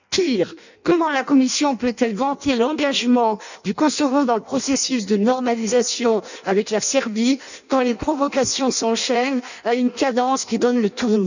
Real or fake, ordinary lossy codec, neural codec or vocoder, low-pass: fake; none; codec, 16 kHz in and 24 kHz out, 0.6 kbps, FireRedTTS-2 codec; 7.2 kHz